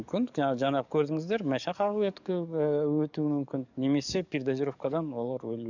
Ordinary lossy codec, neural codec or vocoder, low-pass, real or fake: none; codec, 44.1 kHz, 7.8 kbps, DAC; 7.2 kHz; fake